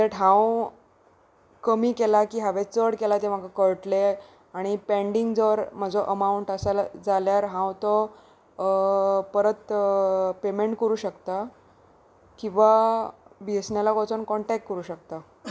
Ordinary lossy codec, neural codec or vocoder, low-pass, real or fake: none; none; none; real